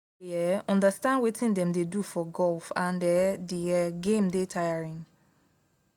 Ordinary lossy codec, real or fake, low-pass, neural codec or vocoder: none; real; 19.8 kHz; none